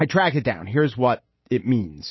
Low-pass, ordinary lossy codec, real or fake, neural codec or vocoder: 7.2 kHz; MP3, 24 kbps; real; none